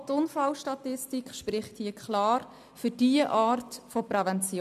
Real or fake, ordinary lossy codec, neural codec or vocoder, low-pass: real; MP3, 96 kbps; none; 14.4 kHz